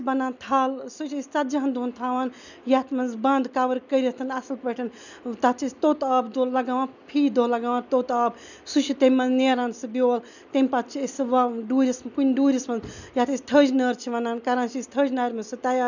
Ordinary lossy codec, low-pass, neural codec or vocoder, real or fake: none; 7.2 kHz; none; real